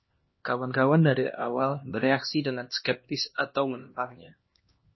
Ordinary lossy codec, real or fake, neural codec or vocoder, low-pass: MP3, 24 kbps; fake; codec, 16 kHz, 1 kbps, X-Codec, HuBERT features, trained on LibriSpeech; 7.2 kHz